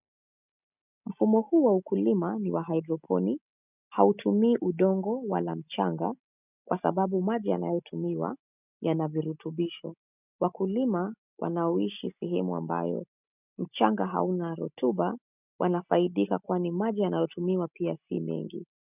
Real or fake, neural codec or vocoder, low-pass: real; none; 3.6 kHz